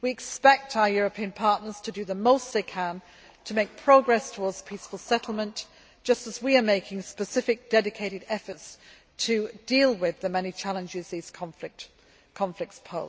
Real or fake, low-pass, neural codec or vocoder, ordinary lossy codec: real; none; none; none